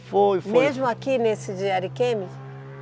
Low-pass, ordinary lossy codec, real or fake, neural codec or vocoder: none; none; real; none